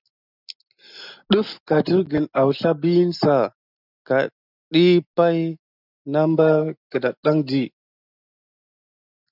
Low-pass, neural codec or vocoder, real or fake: 5.4 kHz; none; real